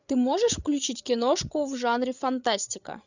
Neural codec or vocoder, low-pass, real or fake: codec, 16 kHz, 8 kbps, FreqCodec, larger model; 7.2 kHz; fake